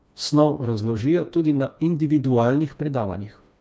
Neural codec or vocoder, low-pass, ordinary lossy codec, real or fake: codec, 16 kHz, 2 kbps, FreqCodec, smaller model; none; none; fake